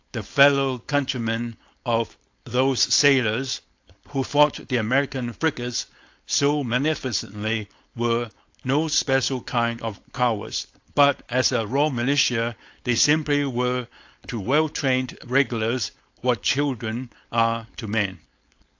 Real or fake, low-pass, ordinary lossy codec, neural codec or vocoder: fake; 7.2 kHz; AAC, 48 kbps; codec, 16 kHz, 4.8 kbps, FACodec